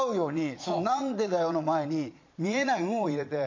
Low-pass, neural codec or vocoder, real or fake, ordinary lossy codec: 7.2 kHz; vocoder, 22.05 kHz, 80 mel bands, Vocos; fake; MP3, 48 kbps